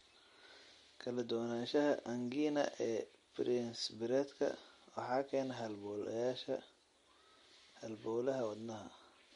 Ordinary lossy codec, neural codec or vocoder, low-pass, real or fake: MP3, 48 kbps; none; 10.8 kHz; real